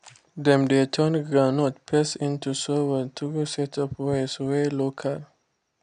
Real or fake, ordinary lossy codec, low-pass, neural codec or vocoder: real; none; 9.9 kHz; none